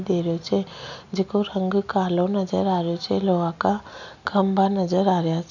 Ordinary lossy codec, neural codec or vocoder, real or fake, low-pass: none; none; real; 7.2 kHz